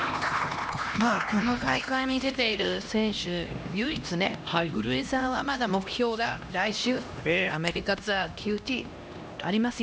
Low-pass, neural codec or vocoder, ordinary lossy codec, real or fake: none; codec, 16 kHz, 1 kbps, X-Codec, HuBERT features, trained on LibriSpeech; none; fake